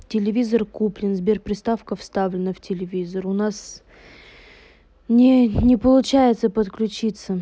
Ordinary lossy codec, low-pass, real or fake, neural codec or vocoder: none; none; real; none